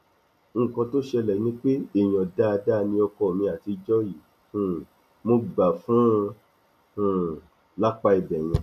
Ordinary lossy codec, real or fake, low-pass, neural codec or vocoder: AAC, 96 kbps; real; 14.4 kHz; none